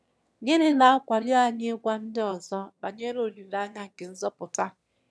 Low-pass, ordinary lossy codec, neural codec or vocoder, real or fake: none; none; autoencoder, 22.05 kHz, a latent of 192 numbers a frame, VITS, trained on one speaker; fake